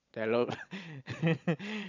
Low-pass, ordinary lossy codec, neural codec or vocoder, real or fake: 7.2 kHz; none; vocoder, 22.05 kHz, 80 mel bands, Vocos; fake